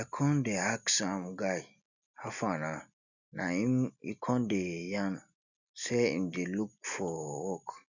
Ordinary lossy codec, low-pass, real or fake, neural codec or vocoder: none; 7.2 kHz; real; none